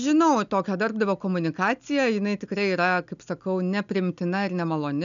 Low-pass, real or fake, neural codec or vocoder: 7.2 kHz; real; none